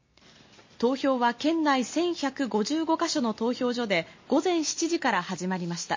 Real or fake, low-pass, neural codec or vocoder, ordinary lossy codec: real; 7.2 kHz; none; MP3, 32 kbps